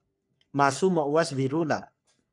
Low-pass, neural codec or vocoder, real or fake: 10.8 kHz; codec, 44.1 kHz, 3.4 kbps, Pupu-Codec; fake